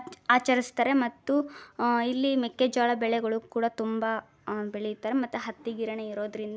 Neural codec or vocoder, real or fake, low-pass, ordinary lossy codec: none; real; none; none